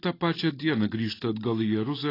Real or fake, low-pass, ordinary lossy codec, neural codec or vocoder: real; 5.4 kHz; AAC, 24 kbps; none